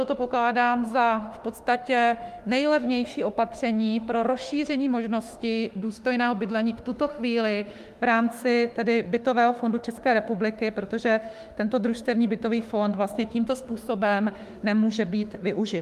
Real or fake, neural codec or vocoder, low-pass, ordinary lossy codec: fake; autoencoder, 48 kHz, 32 numbers a frame, DAC-VAE, trained on Japanese speech; 14.4 kHz; Opus, 32 kbps